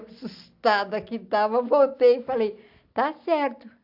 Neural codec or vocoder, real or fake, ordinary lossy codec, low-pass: none; real; AAC, 48 kbps; 5.4 kHz